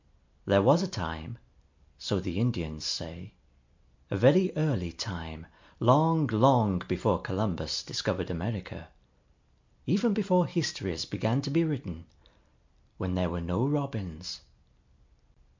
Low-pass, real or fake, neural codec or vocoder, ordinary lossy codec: 7.2 kHz; real; none; MP3, 64 kbps